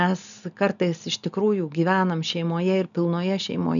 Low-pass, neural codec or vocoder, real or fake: 7.2 kHz; none; real